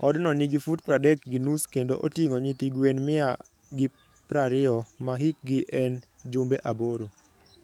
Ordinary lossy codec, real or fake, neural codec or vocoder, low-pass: none; fake; codec, 44.1 kHz, 7.8 kbps, DAC; 19.8 kHz